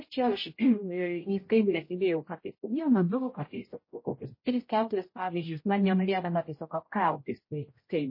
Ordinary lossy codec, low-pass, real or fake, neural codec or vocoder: MP3, 24 kbps; 5.4 kHz; fake; codec, 16 kHz, 0.5 kbps, X-Codec, HuBERT features, trained on general audio